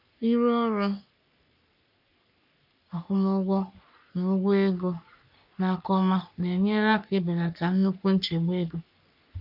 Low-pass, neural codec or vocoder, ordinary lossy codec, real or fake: 5.4 kHz; codec, 44.1 kHz, 3.4 kbps, Pupu-Codec; none; fake